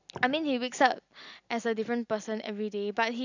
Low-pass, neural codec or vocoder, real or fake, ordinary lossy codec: 7.2 kHz; none; real; none